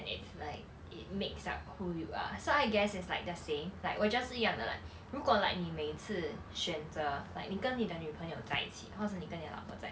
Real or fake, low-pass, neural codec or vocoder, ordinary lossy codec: real; none; none; none